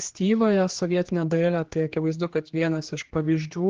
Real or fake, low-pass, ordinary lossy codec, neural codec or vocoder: fake; 7.2 kHz; Opus, 16 kbps; codec, 16 kHz, 2 kbps, X-Codec, HuBERT features, trained on general audio